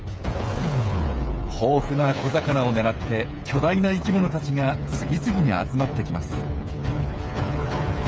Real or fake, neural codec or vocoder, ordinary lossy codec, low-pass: fake; codec, 16 kHz, 8 kbps, FreqCodec, smaller model; none; none